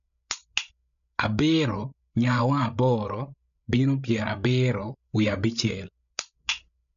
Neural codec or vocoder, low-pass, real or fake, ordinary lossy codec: codec, 16 kHz, 8 kbps, FreqCodec, larger model; 7.2 kHz; fake; none